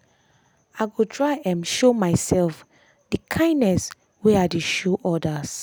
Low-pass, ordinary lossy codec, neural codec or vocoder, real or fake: none; none; none; real